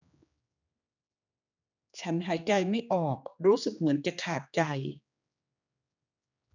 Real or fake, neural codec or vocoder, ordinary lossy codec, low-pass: fake; codec, 16 kHz, 2 kbps, X-Codec, HuBERT features, trained on general audio; none; 7.2 kHz